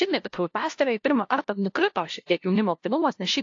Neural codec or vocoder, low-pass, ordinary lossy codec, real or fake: codec, 16 kHz, 0.5 kbps, FunCodec, trained on LibriTTS, 25 frames a second; 7.2 kHz; AAC, 48 kbps; fake